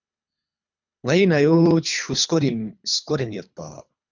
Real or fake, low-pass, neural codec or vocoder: fake; 7.2 kHz; codec, 24 kHz, 3 kbps, HILCodec